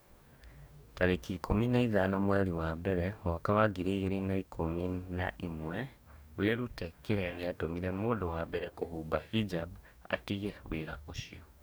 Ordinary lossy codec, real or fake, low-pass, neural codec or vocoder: none; fake; none; codec, 44.1 kHz, 2.6 kbps, DAC